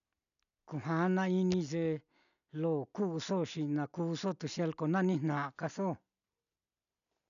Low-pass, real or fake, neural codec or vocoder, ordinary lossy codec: 7.2 kHz; real; none; none